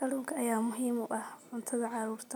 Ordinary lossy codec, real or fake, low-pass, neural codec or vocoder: none; real; none; none